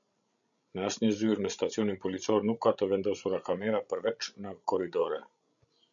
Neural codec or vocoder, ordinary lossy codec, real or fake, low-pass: codec, 16 kHz, 16 kbps, FreqCodec, larger model; MP3, 96 kbps; fake; 7.2 kHz